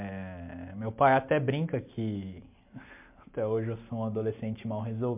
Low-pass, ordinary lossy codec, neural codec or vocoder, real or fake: 3.6 kHz; MP3, 32 kbps; none; real